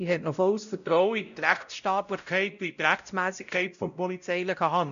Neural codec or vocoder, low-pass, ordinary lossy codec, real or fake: codec, 16 kHz, 0.5 kbps, X-Codec, WavLM features, trained on Multilingual LibriSpeech; 7.2 kHz; none; fake